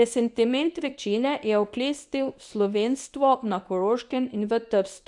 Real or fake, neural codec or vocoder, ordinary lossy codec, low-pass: fake; codec, 24 kHz, 0.9 kbps, WavTokenizer, medium speech release version 1; none; 10.8 kHz